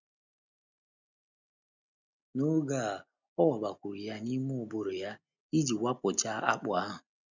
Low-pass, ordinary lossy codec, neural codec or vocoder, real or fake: 7.2 kHz; none; none; real